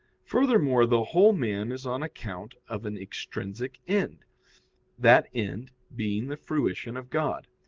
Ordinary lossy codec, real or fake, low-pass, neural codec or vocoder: Opus, 16 kbps; real; 7.2 kHz; none